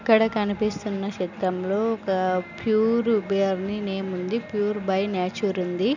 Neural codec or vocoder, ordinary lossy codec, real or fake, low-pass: none; none; real; 7.2 kHz